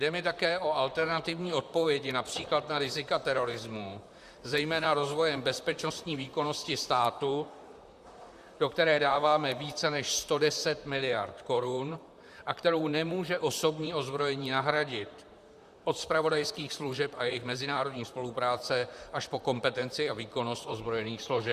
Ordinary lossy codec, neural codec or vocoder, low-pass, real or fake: Opus, 64 kbps; vocoder, 44.1 kHz, 128 mel bands, Pupu-Vocoder; 14.4 kHz; fake